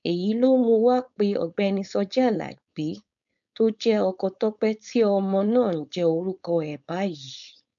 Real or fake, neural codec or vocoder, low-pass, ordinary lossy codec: fake; codec, 16 kHz, 4.8 kbps, FACodec; 7.2 kHz; MP3, 64 kbps